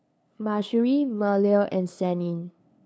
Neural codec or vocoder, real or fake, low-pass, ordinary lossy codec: codec, 16 kHz, 2 kbps, FunCodec, trained on LibriTTS, 25 frames a second; fake; none; none